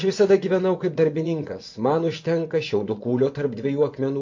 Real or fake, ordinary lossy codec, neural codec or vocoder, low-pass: fake; MP3, 48 kbps; vocoder, 24 kHz, 100 mel bands, Vocos; 7.2 kHz